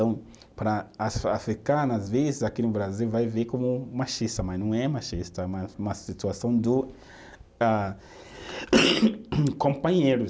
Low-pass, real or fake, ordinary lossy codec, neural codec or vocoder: none; real; none; none